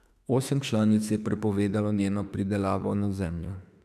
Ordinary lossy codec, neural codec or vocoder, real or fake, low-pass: none; autoencoder, 48 kHz, 32 numbers a frame, DAC-VAE, trained on Japanese speech; fake; 14.4 kHz